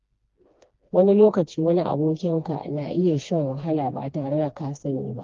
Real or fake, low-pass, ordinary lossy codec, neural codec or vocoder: fake; 7.2 kHz; Opus, 32 kbps; codec, 16 kHz, 2 kbps, FreqCodec, smaller model